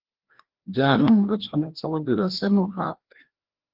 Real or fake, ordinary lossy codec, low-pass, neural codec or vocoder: fake; Opus, 16 kbps; 5.4 kHz; codec, 16 kHz, 1 kbps, FreqCodec, larger model